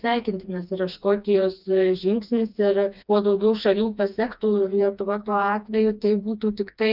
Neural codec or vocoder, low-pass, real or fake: codec, 16 kHz, 2 kbps, FreqCodec, smaller model; 5.4 kHz; fake